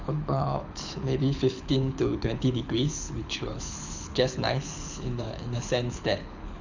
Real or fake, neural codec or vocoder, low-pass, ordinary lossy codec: fake; codec, 24 kHz, 6 kbps, HILCodec; 7.2 kHz; none